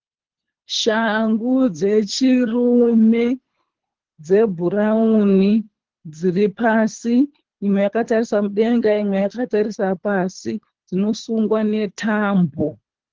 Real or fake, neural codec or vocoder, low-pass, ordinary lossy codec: fake; codec, 24 kHz, 3 kbps, HILCodec; 7.2 kHz; Opus, 16 kbps